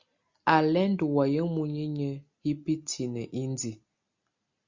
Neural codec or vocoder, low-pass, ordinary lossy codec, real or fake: none; 7.2 kHz; Opus, 64 kbps; real